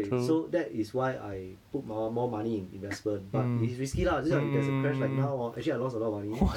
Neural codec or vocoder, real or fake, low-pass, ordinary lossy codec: none; real; 19.8 kHz; none